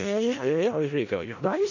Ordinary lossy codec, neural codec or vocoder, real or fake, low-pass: none; codec, 16 kHz in and 24 kHz out, 0.4 kbps, LongCat-Audio-Codec, four codebook decoder; fake; 7.2 kHz